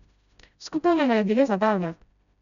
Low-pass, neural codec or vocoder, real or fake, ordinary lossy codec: 7.2 kHz; codec, 16 kHz, 0.5 kbps, FreqCodec, smaller model; fake; none